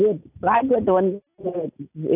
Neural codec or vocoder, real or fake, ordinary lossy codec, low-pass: none; real; none; 3.6 kHz